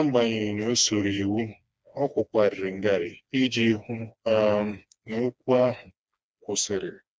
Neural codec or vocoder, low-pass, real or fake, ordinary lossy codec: codec, 16 kHz, 2 kbps, FreqCodec, smaller model; none; fake; none